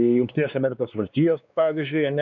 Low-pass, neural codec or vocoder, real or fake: 7.2 kHz; codec, 16 kHz, 2 kbps, X-Codec, WavLM features, trained on Multilingual LibriSpeech; fake